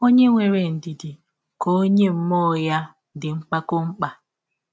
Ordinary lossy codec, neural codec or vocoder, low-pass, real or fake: none; none; none; real